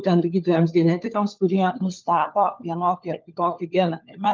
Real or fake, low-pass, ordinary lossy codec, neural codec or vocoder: fake; 7.2 kHz; Opus, 24 kbps; codec, 16 kHz, 4 kbps, FunCodec, trained on LibriTTS, 50 frames a second